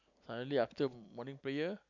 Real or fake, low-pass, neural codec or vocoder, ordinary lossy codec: real; 7.2 kHz; none; none